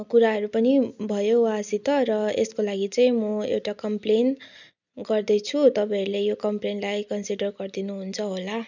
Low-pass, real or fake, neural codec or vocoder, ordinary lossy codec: 7.2 kHz; real; none; none